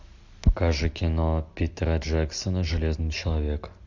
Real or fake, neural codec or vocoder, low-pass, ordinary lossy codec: real; none; 7.2 kHz; MP3, 64 kbps